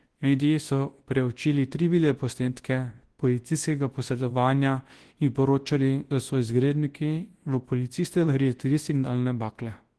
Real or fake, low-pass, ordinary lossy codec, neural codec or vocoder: fake; 10.8 kHz; Opus, 16 kbps; codec, 24 kHz, 0.9 kbps, WavTokenizer, large speech release